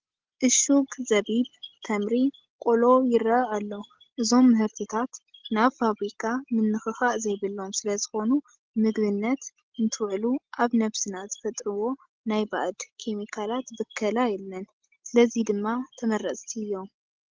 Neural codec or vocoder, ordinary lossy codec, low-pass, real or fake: none; Opus, 16 kbps; 7.2 kHz; real